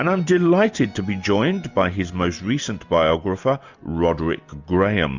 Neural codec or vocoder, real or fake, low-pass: none; real; 7.2 kHz